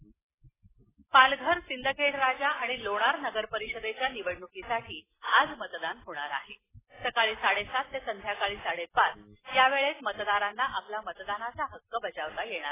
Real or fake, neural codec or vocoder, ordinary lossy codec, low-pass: real; none; AAC, 16 kbps; 3.6 kHz